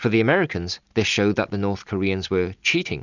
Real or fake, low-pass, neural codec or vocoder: real; 7.2 kHz; none